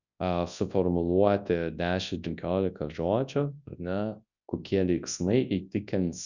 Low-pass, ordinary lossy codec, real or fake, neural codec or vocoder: 7.2 kHz; Opus, 64 kbps; fake; codec, 24 kHz, 0.9 kbps, WavTokenizer, large speech release